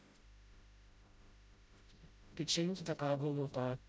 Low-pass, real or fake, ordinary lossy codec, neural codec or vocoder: none; fake; none; codec, 16 kHz, 0.5 kbps, FreqCodec, smaller model